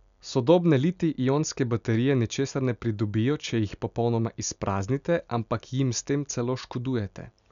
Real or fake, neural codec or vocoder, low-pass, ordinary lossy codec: real; none; 7.2 kHz; none